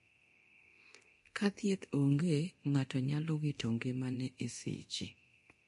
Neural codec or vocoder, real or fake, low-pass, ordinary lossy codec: codec, 24 kHz, 0.9 kbps, DualCodec; fake; 10.8 kHz; MP3, 48 kbps